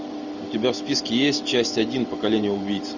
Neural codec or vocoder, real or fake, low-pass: none; real; 7.2 kHz